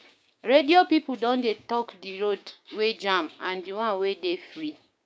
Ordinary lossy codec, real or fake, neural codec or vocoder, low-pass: none; fake; codec, 16 kHz, 6 kbps, DAC; none